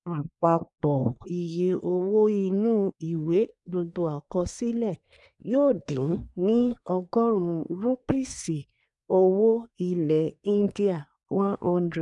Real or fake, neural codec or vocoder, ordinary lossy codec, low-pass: fake; codec, 24 kHz, 1 kbps, SNAC; none; 10.8 kHz